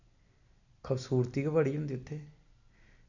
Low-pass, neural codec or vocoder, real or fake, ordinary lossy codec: 7.2 kHz; none; real; none